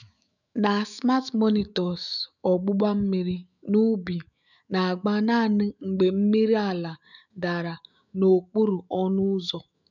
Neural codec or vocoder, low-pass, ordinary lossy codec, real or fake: autoencoder, 48 kHz, 128 numbers a frame, DAC-VAE, trained on Japanese speech; 7.2 kHz; none; fake